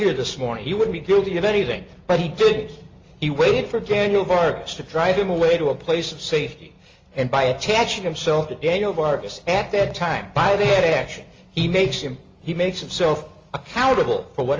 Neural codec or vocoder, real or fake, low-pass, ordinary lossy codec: none; real; 7.2 kHz; Opus, 32 kbps